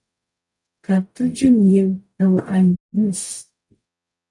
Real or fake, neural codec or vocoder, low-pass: fake; codec, 44.1 kHz, 0.9 kbps, DAC; 10.8 kHz